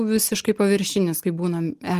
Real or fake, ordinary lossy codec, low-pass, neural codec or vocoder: real; Opus, 24 kbps; 14.4 kHz; none